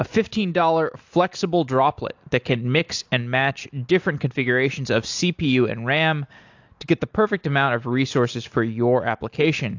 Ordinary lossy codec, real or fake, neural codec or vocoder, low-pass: AAC, 48 kbps; real; none; 7.2 kHz